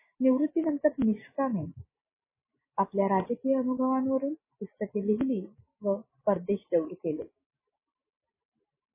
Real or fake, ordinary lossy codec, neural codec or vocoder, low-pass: real; MP3, 16 kbps; none; 3.6 kHz